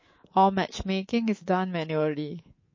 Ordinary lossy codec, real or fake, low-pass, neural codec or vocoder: MP3, 32 kbps; fake; 7.2 kHz; codec, 24 kHz, 3.1 kbps, DualCodec